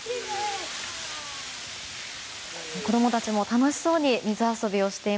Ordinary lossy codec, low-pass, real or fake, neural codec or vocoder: none; none; real; none